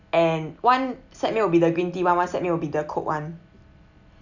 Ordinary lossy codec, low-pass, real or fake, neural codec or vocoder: none; 7.2 kHz; real; none